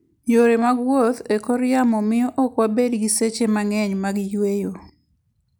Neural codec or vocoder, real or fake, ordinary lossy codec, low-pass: none; real; none; none